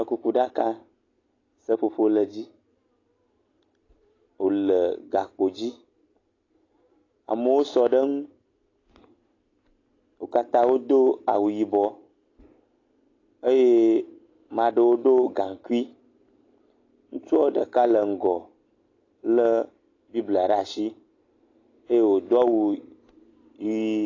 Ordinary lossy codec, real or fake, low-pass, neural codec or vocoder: AAC, 32 kbps; real; 7.2 kHz; none